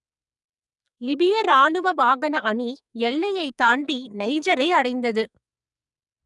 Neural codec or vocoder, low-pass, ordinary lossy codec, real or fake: codec, 44.1 kHz, 2.6 kbps, SNAC; 10.8 kHz; none; fake